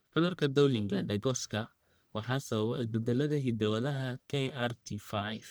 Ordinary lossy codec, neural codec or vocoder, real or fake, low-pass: none; codec, 44.1 kHz, 1.7 kbps, Pupu-Codec; fake; none